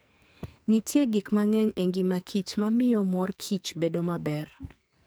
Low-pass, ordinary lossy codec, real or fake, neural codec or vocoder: none; none; fake; codec, 44.1 kHz, 2.6 kbps, SNAC